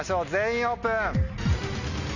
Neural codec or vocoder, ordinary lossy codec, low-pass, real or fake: none; none; 7.2 kHz; real